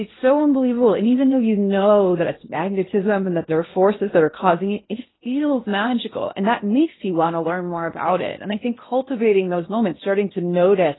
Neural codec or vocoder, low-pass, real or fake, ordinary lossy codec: codec, 16 kHz in and 24 kHz out, 0.8 kbps, FocalCodec, streaming, 65536 codes; 7.2 kHz; fake; AAC, 16 kbps